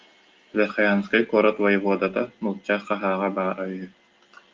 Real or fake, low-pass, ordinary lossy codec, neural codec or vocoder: real; 7.2 kHz; Opus, 24 kbps; none